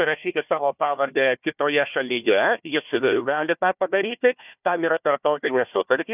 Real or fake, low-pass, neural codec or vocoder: fake; 3.6 kHz; codec, 16 kHz, 1 kbps, FunCodec, trained on Chinese and English, 50 frames a second